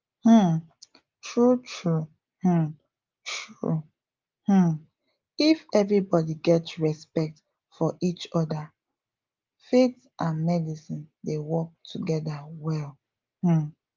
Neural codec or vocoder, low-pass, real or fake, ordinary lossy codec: none; 7.2 kHz; real; Opus, 32 kbps